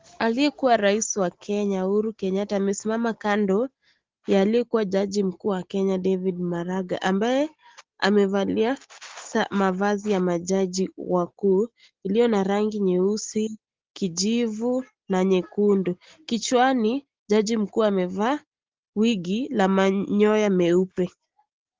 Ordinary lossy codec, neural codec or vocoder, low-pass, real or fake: Opus, 16 kbps; none; 7.2 kHz; real